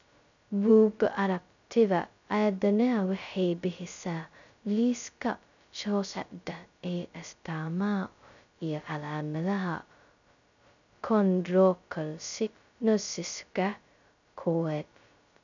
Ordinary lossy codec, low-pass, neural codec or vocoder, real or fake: none; 7.2 kHz; codec, 16 kHz, 0.2 kbps, FocalCodec; fake